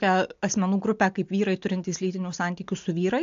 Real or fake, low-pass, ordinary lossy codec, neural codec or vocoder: real; 7.2 kHz; AAC, 64 kbps; none